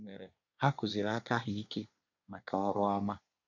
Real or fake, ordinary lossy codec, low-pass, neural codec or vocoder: fake; none; 7.2 kHz; codec, 24 kHz, 1 kbps, SNAC